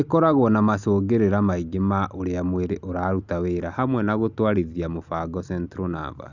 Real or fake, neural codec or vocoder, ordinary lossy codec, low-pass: real; none; Opus, 64 kbps; 7.2 kHz